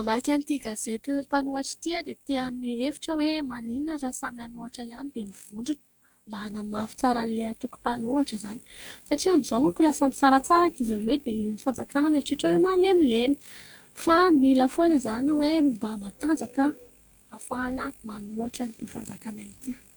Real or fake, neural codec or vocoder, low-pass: fake; codec, 44.1 kHz, 2.6 kbps, DAC; 19.8 kHz